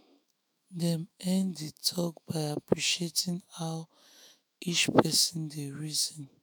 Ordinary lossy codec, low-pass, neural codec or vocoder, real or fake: none; none; autoencoder, 48 kHz, 128 numbers a frame, DAC-VAE, trained on Japanese speech; fake